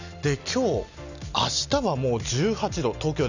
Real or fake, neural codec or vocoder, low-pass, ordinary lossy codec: real; none; 7.2 kHz; none